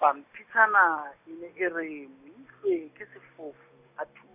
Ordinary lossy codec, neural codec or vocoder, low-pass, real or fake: none; none; 3.6 kHz; real